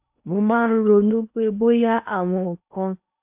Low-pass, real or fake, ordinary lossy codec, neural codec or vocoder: 3.6 kHz; fake; AAC, 32 kbps; codec, 16 kHz in and 24 kHz out, 0.8 kbps, FocalCodec, streaming, 65536 codes